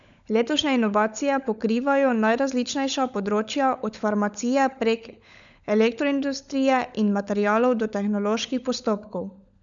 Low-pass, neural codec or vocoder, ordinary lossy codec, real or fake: 7.2 kHz; codec, 16 kHz, 16 kbps, FunCodec, trained on LibriTTS, 50 frames a second; none; fake